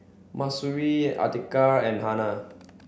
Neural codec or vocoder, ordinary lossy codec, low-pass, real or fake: none; none; none; real